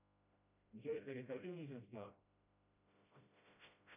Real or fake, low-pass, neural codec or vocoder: fake; 3.6 kHz; codec, 16 kHz, 0.5 kbps, FreqCodec, smaller model